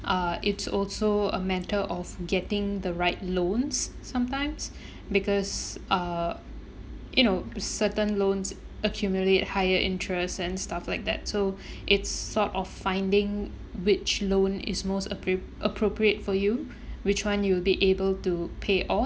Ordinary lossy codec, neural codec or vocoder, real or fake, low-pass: none; none; real; none